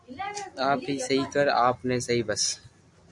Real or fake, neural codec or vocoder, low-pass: real; none; 10.8 kHz